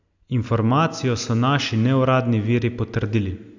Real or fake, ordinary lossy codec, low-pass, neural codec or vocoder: real; none; 7.2 kHz; none